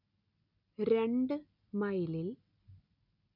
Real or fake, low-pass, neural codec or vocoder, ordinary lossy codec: real; 5.4 kHz; none; none